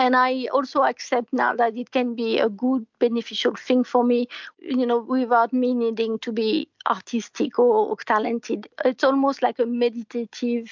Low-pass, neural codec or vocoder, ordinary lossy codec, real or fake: 7.2 kHz; none; MP3, 64 kbps; real